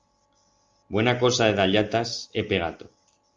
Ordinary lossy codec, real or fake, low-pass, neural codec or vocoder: Opus, 32 kbps; real; 7.2 kHz; none